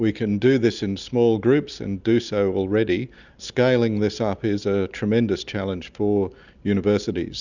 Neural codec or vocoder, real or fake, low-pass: none; real; 7.2 kHz